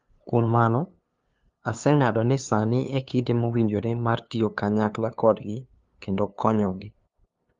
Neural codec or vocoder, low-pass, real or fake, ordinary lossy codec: codec, 16 kHz, 2 kbps, FunCodec, trained on LibriTTS, 25 frames a second; 7.2 kHz; fake; Opus, 32 kbps